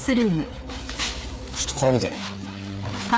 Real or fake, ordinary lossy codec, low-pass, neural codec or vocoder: fake; none; none; codec, 16 kHz, 4 kbps, FreqCodec, larger model